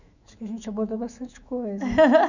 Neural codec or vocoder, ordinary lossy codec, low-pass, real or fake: autoencoder, 48 kHz, 128 numbers a frame, DAC-VAE, trained on Japanese speech; none; 7.2 kHz; fake